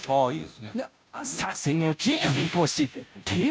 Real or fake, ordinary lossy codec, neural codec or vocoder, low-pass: fake; none; codec, 16 kHz, 0.5 kbps, FunCodec, trained on Chinese and English, 25 frames a second; none